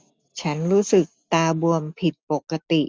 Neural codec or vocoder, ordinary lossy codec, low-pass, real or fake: none; none; none; real